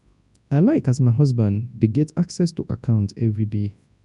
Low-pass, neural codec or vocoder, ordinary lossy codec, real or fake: 10.8 kHz; codec, 24 kHz, 0.9 kbps, WavTokenizer, large speech release; none; fake